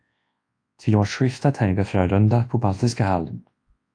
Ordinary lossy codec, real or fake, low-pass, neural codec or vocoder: AAC, 48 kbps; fake; 9.9 kHz; codec, 24 kHz, 0.9 kbps, WavTokenizer, large speech release